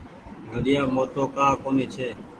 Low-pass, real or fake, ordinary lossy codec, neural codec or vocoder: 10.8 kHz; real; Opus, 16 kbps; none